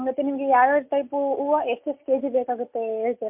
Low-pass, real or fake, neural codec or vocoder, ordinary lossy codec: 3.6 kHz; real; none; MP3, 32 kbps